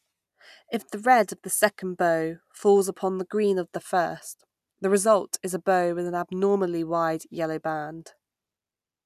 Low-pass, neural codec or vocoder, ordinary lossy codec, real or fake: 14.4 kHz; none; none; real